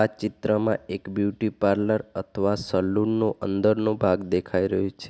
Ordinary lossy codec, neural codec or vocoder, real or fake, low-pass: none; none; real; none